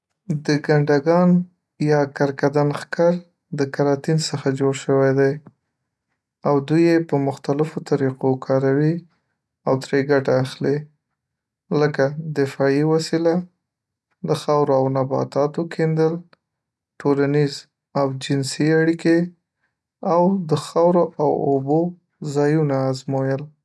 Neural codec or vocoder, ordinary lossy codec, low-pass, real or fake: none; none; none; real